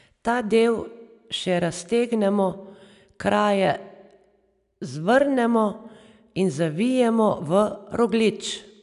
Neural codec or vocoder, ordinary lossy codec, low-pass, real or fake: none; none; 10.8 kHz; real